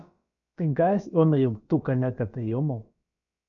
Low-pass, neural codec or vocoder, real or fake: 7.2 kHz; codec, 16 kHz, about 1 kbps, DyCAST, with the encoder's durations; fake